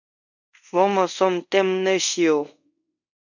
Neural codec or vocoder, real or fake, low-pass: codec, 24 kHz, 0.5 kbps, DualCodec; fake; 7.2 kHz